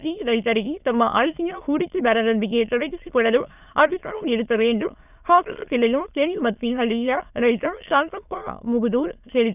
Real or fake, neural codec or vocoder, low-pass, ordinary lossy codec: fake; autoencoder, 22.05 kHz, a latent of 192 numbers a frame, VITS, trained on many speakers; 3.6 kHz; none